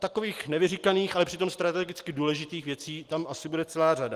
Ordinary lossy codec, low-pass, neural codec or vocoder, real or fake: Opus, 24 kbps; 14.4 kHz; none; real